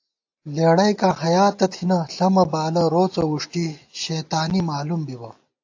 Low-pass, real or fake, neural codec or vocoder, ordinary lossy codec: 7.2 kHz; real; none; AAC, 48 kbps